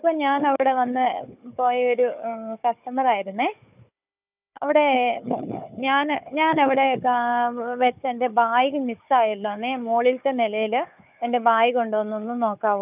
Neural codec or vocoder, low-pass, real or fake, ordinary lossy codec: codec, 16 kHz, 4 kbps, FunCodec, trained on Chinese and English, 50 frames a second; 3.6 kHz; fake; none